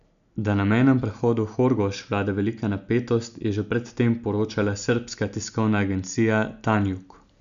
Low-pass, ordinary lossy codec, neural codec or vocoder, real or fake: 7.2 kHz; none; none; real